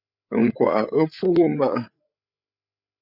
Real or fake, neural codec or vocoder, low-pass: fake; codec, 16 kHz, 8 kbps, FreqCodec, larger model; 5.4 kHz